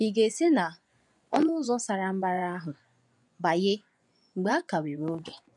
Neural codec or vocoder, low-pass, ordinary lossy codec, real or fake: vocoder, 24 kHz, 100 mel bands, Vocos; 10.8 kHz; none; fake